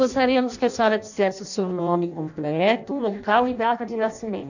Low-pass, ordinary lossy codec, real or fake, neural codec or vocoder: 7.2 kHz; none; fake; codec, 16 kHz in and 24 kHz out, 0.6 kbps, FireRedTTS-2 codec